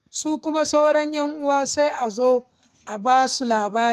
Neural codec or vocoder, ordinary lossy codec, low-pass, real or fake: codec, 32 kHz, 1.9 kbps, SNAC; none; 14.4 kHz; fake